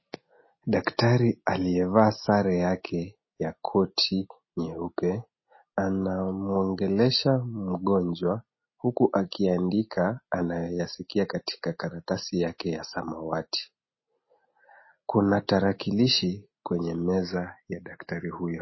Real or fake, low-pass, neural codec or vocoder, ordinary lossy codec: real; 7.2 kHz; none; MP3, 24 kbps